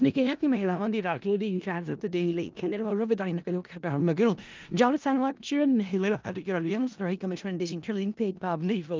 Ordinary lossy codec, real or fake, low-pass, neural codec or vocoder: Opus, 24 kbps; fake; 7.2 kHz; codec, 16 kHz in and 24 kHz out, 0.4 kbps, LongCat-Audio-Codec, four codebook decoder